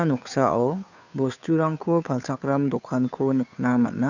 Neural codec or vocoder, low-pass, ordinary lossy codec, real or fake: codec, 16 kHz, 2 kbps, FunCodec, trained on Chinese and English, 25 frames a second; 7.2 kHz; none; fake